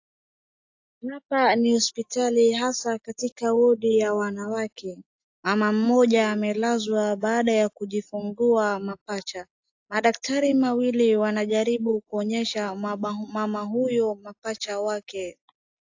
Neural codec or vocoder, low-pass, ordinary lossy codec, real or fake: none; 7.2 kHz; AAC, 48 kbps; real